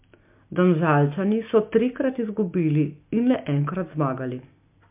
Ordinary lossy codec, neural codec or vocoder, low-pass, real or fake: MP3, 32 kbps; none; 3.6 kHz; real